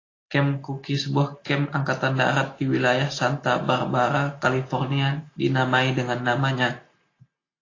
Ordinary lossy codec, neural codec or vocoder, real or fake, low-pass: AAC, 32 kbps; none; real; 7.2 kHz